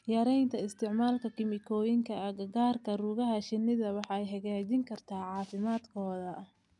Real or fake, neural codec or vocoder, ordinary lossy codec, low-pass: real; none; none; 10.8 kHz